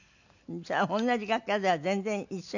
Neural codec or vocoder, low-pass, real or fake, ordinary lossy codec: none; 7.2 kHz; real; none